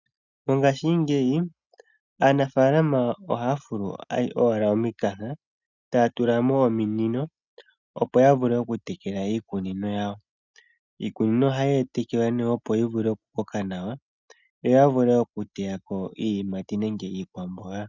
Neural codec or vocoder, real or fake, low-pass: none; real; 7.2 kHz